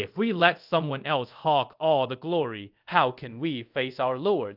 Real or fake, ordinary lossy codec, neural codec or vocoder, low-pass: fake; Opus, 24 kbps; codec, 24 kHz, 0.5 kbps, DualCodec; 5.4 kHz